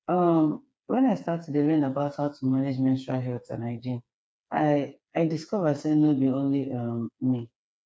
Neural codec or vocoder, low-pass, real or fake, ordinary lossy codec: codec, 16 kHz, 4 kbps, FreqCodec, smaller model; none; fake; none